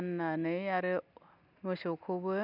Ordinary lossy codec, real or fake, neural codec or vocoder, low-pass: none; real; none; 5.4 kHz